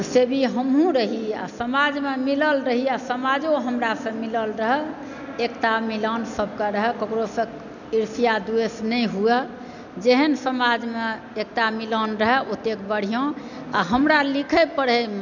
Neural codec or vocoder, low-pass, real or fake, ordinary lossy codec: none; 7.2 kHz; real; none